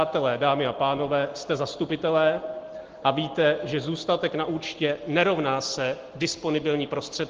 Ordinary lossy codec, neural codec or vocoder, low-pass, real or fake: Opus, 16 kbps; none; 7.2 kHz; real